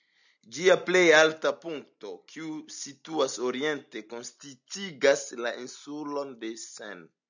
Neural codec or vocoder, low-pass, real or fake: none; 7.2 kHz; real